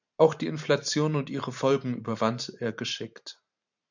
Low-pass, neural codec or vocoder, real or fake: 7.2 kHz; vocoder, 44.1 kHz, 128 mel bands every 512 samples, BigVGAN v2; fake